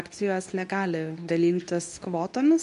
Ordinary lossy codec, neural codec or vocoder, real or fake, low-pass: MP3, 96 kbps; codec, 24 kHz, 0.9 kbps, WavTokenizer, medium speech release version 2; fake; 10.8 kHz